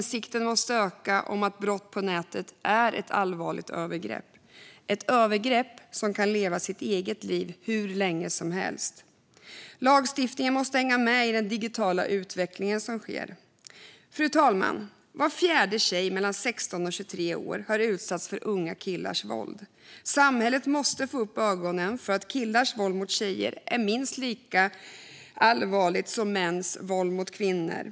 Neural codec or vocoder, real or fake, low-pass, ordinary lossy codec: none; real; none; none